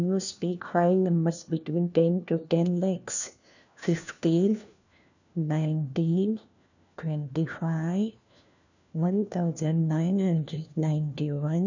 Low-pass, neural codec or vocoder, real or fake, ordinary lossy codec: 7.2 kHz; codec, 16 kHz, 1 kbps, FunCodec, trained on LibriTTS, 50 frames a second; fake; none